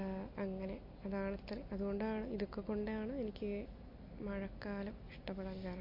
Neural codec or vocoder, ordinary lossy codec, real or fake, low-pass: none; MP3, 32 kbps; real; 5.4 kHz